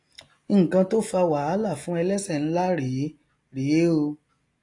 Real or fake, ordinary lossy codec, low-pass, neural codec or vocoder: real; AAC, 48 kbps; 10.8 kHz; none